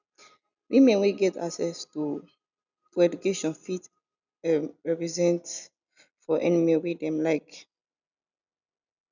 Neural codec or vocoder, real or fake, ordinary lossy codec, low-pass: none; real; none; 7.2 kHz